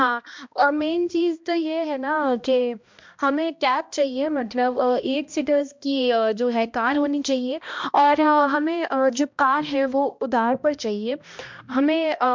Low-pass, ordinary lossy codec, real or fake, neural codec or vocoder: 7.2 kHz; MP3, 64 kbps; fake; codec, 16 kHz, 1 kbps, X-Codec, HuBERT features, trained on balanced general audio